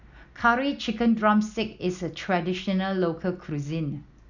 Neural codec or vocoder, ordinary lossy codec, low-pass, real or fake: none; none; 7.2 kHz; real